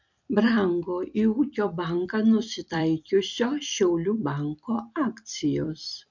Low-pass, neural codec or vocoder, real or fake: 7.2 kHz; vocoder, 44.1 kHz, 128 mel bands every 256 samples, BigVGAN v2; fake